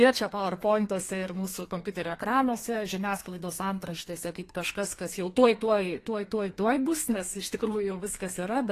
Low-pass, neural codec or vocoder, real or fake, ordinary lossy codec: 14.4 kHz; codec, 32 kHz, 1.9 kbps, SNAC; fake; AAC, 48 kbps